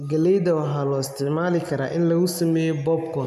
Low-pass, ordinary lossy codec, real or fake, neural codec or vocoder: 14.4 kHz; AAC, 96 kbps; real; none